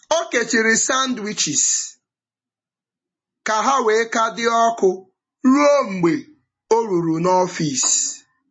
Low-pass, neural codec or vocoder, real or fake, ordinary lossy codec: 9.9 kHz; none; real; MP3, 32 kbps